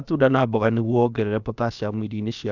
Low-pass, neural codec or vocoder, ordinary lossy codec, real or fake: 7.2 kHz; codec, 16 kHz, about 1 kbps, DyCAST, with the encoder's durations; none; fake